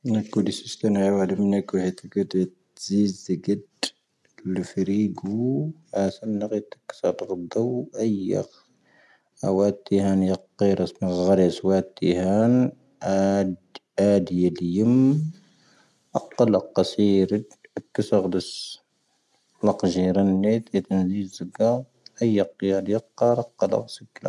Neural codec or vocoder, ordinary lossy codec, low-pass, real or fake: none; none; none; real